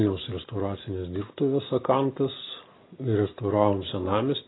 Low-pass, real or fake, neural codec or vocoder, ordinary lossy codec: 7.2 kHz; real; none; AAC, 16 kbps